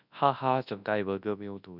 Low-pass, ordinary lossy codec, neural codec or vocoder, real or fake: 5.4 kHz; none; codec, 24 kHz, 0.9 kbps, WavTokenizer, large speech release; fake